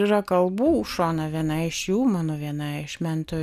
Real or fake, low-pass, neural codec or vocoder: real; 14.4 kHz; none